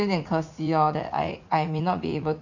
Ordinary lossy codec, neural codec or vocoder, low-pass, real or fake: none; vocoder, 44.1 kHz, 80 mel bands, Vocos; 7.2 kHz; fake